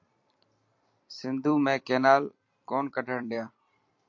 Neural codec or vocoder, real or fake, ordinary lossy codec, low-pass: vocoder, 44.1 kHz, 128 mel bands every 256 samples, BigVGAN v2; fake; MP3, 48 kbps; 7.2 kHz